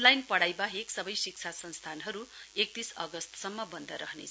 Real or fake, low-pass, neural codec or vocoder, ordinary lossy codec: real; none; none; none